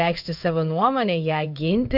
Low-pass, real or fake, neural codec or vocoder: 5.4 kHz; fake; codec, 16 kHz in and 24 kHz out, 1 kbps, XY-Tokenizer